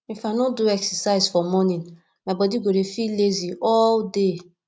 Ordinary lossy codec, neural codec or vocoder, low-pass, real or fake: none; none; none; real